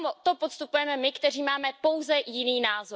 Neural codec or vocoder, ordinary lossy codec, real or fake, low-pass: none; none; real; none